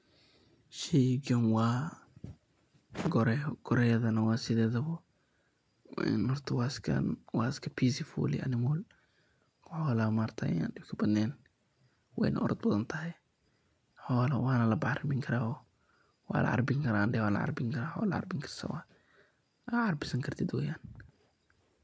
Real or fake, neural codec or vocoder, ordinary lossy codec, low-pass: real; none; none; none